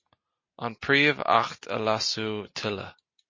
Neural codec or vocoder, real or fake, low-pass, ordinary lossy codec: none; real; 7.2 kHz; MP3, 32 kbps